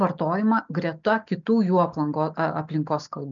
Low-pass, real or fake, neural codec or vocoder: 7.2 kHz; real; none